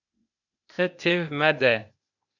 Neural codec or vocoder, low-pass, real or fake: codec, 16 kHz, 0.8 kbps, ZipCodec; 7.2 kHz; fake